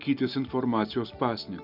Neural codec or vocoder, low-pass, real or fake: none; 5.4 kHz; real